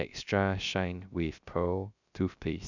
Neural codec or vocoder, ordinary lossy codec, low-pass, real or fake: codec, 16 kHz, 0.3 kbps, FocalCodec; none; 7.2 kHz; fake